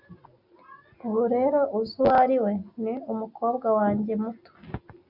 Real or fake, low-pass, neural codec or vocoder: real; 5.4 kHz; none